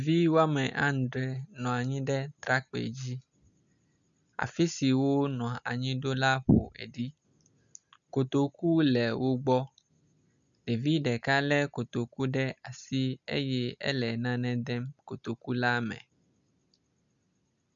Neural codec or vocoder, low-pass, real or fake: none; 7.2 kHz; real